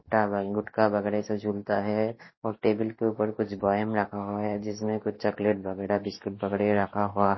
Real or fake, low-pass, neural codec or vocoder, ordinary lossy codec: real; 7.2 kHz; none; MP3, 24 kbps